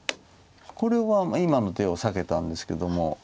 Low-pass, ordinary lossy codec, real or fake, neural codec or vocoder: none; none; real; none